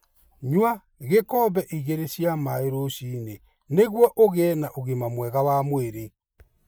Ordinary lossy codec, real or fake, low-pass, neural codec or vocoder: none; real; none; none